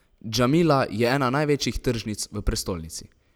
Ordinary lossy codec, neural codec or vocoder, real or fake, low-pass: none; none; real; none